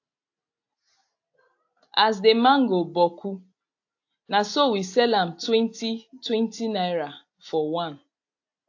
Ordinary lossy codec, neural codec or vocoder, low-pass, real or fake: AAC, 48 kbps; none; 7.2 kHz; real